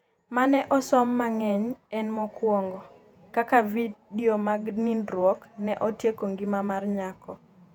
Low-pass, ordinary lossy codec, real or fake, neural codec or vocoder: 19.8 kHz; none; fake; vocoder, 48 kHz, 128 mel bands, Vocos